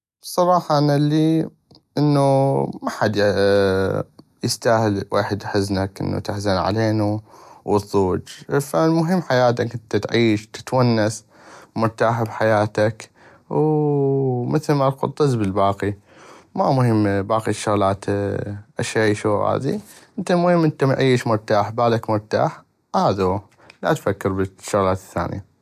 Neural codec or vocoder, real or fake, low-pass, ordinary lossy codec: none; real; 14.4 kHz; none